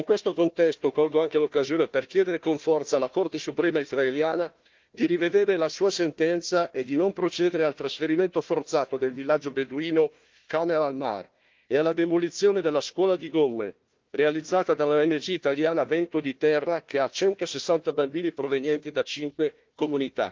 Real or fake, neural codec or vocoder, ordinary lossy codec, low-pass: fake; codec, 16 kHz, 1 kbps, FunCodec, trained on Chinese and English, 50 frames a second; Opus, 32 kbps; 7.2 kHz